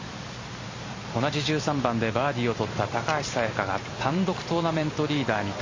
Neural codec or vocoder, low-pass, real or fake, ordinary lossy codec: vocoder, 44.1 kHz, 128 mel bands every 256 samples, BigVGAN v2; 7.2 kHz; fake; MP3, 32 kbps